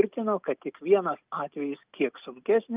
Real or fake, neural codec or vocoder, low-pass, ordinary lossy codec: real; none; 3.6 kHz; Opus, 24 kbps